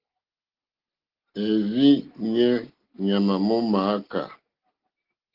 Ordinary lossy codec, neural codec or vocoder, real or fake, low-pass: Opus, 16 kbps; none; real; 5.4 kHz